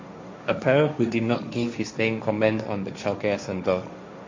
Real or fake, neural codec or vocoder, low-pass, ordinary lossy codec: fake; codec, 16 kHz, 1.1 kbps, Voila-Tokenizer; 7.2 kHz; MP3, 48 kbps